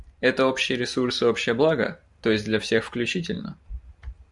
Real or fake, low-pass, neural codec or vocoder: fake; 10.8 kHz; vocoder, 44.1 kHz, 128 mel bands every 512 samples, BigVGAN v2